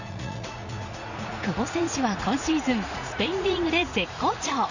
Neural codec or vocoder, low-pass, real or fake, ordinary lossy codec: vocoder, 44.1 kHz, 80 mel bands, Vocos; 7.2 kHz; fake; none